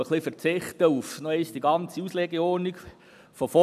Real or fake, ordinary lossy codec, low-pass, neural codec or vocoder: real; none; 14.4 kHz; none